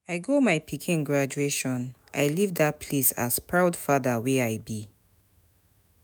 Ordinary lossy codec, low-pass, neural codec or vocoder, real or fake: none; none; autoencoder, 48 kHz, 128 numbers a frame, DAC-VAE, trained on Japanese speech; fake